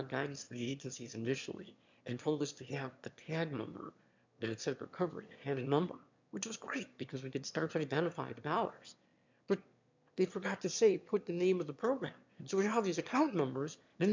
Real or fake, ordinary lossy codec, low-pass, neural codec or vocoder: fake; MP3, 64 kbps; 7.2 kHz; autoencoder, 22.05 kHz, a latent of 192 numbers a frame, VITS, trained on one speaker